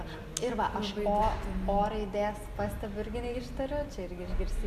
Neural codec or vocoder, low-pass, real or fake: none; 14.4 kHz; real